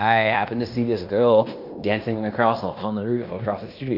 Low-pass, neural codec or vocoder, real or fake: 5.4 kHz; codec, 16 kHz in and 24 kHz out, 0.9 kbps, LongCat-Audio-Codec, fine tuned four codebook decoder; fake